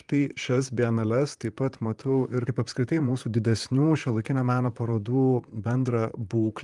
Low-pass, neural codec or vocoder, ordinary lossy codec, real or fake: 10.8 kHz; vocoder, 44.1 kHz, 128 mel bands, Pupu-Vocoder; Opus, 32 kbps; fake